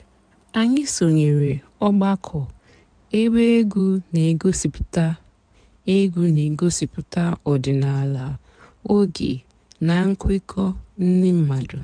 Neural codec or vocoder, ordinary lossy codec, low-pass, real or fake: codec, 16 kHz in and 24 kHz out, 2.2 kbps, FireRedTTS-2 codec; MP3, 96 kbps; 9.9 kHz; fake